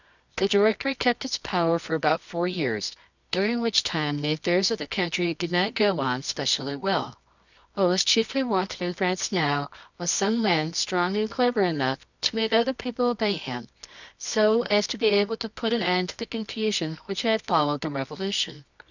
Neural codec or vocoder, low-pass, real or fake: codec, 24 kHz, 0.9 kbps, WavTokenizer, medium music audio release; 7.2 kHz; fake